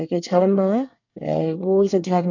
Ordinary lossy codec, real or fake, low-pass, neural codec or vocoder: none; fake; 7.2 kHz; codec, 24 kHz, 1 kbps, SNAC